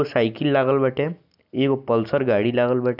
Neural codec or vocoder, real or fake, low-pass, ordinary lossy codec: none; real; 5.4 kHz; none